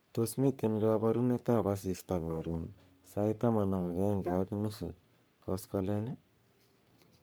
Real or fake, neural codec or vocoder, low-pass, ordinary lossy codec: fake; codec, 44.1 kHz, 3.4 kbps, Pupu-Codec; none; none